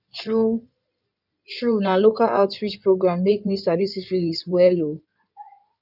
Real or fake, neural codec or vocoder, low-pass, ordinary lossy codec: fake; codec, 16 kHz in and 24 kHz out, 2.2 kbps, FireRedTTS-2 codec; 5.4 kHz; none